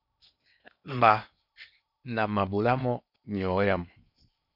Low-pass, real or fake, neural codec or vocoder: 5.4 kHz; fake; codec, 16 kHz in and 24 kHz out, 0.8 kbps, FocalCodec, streaming, 65536 codes